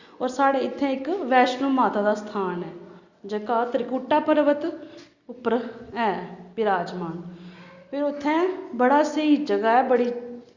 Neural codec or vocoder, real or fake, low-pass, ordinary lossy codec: none; real; 7.2 kHz; Opus, 64 kbps